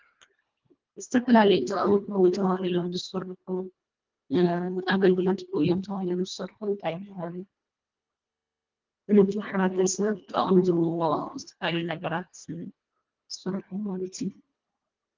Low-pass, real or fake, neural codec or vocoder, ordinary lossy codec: 7.2 kHz; fake; codec, 24 kHz, 1.5 kbps, HILCodec; Opus, 24 kbps